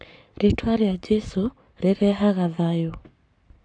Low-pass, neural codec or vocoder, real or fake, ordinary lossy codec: 9.9 kHz; codec, 44.1 kHz, 7.8 kbps, Pupu-Codec; fake; AAC, 64 kbps